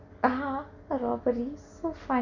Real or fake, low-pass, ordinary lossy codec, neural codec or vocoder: real; 7.2 kHz; none; none